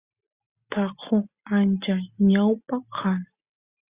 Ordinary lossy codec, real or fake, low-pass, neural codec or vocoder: Opus, 64 kbps; real; 3.6 kHz; none